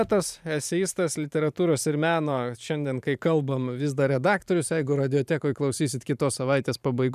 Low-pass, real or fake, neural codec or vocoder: 14.4 kHz; fake; vocoder, 44.1 kHz, 128 mel bands every 512 samples, BigVGAN v2